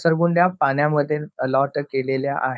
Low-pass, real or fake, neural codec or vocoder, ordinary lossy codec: none; fake; codec, 16 kHz, 4.8 kbps, FACodec; none